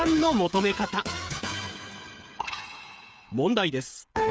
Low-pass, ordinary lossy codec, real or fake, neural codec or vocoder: none; none; fake; codec, 16 kHz, 8 kbps, FreqCodec, smaller model